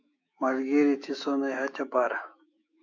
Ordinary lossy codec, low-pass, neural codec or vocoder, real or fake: MP3, 64 kbps; 7.2 kHz; autoencoder, 48 kHz, 128 numbers a frame, DAC-VAE, trained on Japanese speech; fake